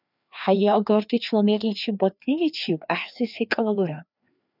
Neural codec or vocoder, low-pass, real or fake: codec, 16 kHz, 2 kbps, FreqCodec, larger model; 5.4 kHz; fake